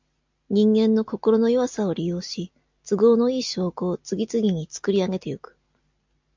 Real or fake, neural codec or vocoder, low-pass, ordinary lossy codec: real; none; 7.2 kHz; MP3, 64 kbps